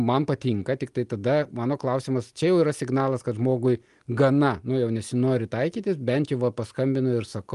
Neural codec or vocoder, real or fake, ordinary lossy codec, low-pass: none; real; Opus, 32 kbps; 10.8 kHz